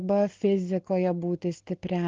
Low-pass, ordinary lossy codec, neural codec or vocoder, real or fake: 7.2 kHz; Opus, 16 kbps; none; real